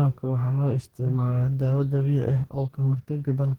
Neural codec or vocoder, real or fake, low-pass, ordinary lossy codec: codec, 44.1 kHz, 2.6 kbps, DAC; fake; 19.8 kHz; Opus, 16 kbps